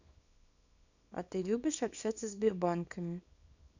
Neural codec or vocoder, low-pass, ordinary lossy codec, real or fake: codec, 24 kHz, 0.9 kbps, WavTokenizer, small release; 7.2 kHz; none; fake